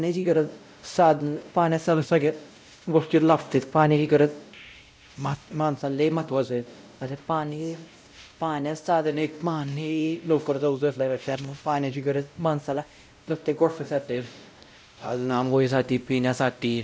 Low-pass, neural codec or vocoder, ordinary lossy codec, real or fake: none; codec, 16 kHz, 0.5 kbps, X-Codec, WavLM features, trained on Multilingual LibriSpeech; none; fake